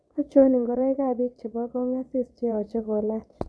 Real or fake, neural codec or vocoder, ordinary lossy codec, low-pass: fake; vocoder, 22.05 kHz, 80 mel bands, Vocos; none; none